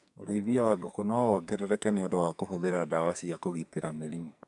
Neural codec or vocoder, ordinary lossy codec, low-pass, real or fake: codec, 44.1 kHz, 2.6 kbps, SNAC; none; 10.8 kHz; fake